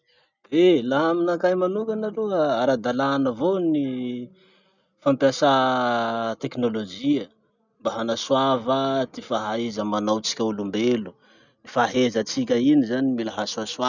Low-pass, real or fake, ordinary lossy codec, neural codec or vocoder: 7.2 kHz; real; none; none